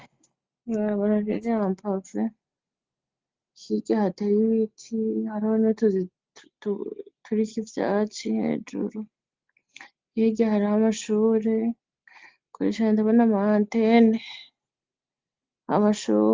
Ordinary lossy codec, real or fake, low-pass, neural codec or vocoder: Opus, 24 kbps; real; 7.2 kHz; none